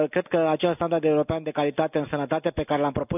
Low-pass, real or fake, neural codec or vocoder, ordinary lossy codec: 3.6 kHz; real; none; none